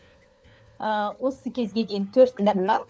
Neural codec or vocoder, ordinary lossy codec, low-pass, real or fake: codec, 16 kHz, 4 kbps, FunCodec, trained on LibriTTS, 50 frames a second; none; none; fake